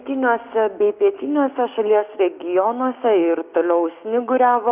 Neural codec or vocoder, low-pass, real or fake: codec, 16 kHz, 6 kbps, DAC; 3.6 kHz; fake